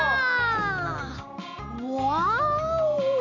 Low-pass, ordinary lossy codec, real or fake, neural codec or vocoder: 7.2 kHz; none; real; none